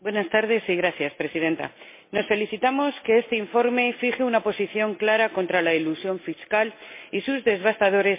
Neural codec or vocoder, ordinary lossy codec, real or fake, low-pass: none; MP3, 24 kbps; real; 3.6 kHz